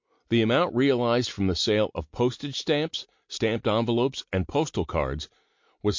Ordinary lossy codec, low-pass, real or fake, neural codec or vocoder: MP3, 48 kbps; 7.2 kHz; real; none